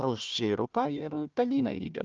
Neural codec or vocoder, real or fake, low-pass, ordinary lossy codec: codec, 16 kHz, 1 kbps, FunCodec, trained on Chinese and English, 50 frames a second; fake; 7.2 kHz; Opus, 24 kbps